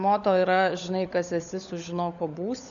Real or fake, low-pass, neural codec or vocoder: fake; 7.2 kHz; codec, 16 kHz, 16 kbps, FunCodec, trained on LibriTTS, 50 frames a second